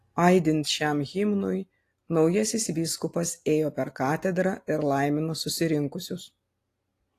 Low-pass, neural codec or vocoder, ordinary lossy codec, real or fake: 14.4 kHz; none; AAC, 48 kbps; real